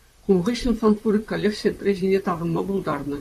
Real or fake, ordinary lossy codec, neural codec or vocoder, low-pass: fake; AAC, 64 kbps; vocoder, 44.1 kHz, 128 mel bands, Pupu-Vocoder; 14.4 kHz